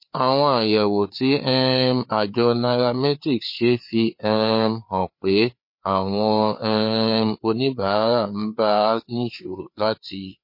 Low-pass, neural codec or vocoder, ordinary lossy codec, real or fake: 5.4 kHz; codec, 16 kHz, 4 kbps, FreqCodec, larger model; MP3, 32 kbps; fake